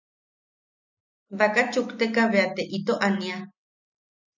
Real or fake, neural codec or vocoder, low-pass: real; none; 7.2 kHz